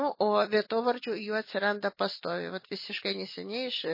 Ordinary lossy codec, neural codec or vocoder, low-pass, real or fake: MP3, 24 kbps; none; 5.4 kHz; real